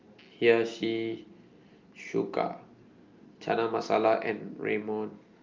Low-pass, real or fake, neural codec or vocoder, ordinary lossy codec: 7.2 kHz; real; none; Opus, 24 kbps